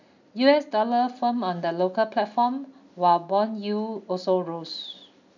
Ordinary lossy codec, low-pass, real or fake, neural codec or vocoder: none; 7.2 kHz; real; none